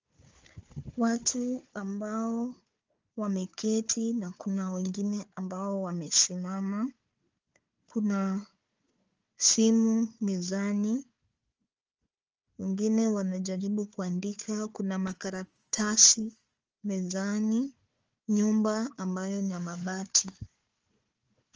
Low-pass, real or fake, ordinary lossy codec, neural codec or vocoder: 7.2 kHz; fake; Opus, 24 kbps; codec, 16 kHz, 4 kbps, FunCodec, trained on Chinese and English, 50 frames a second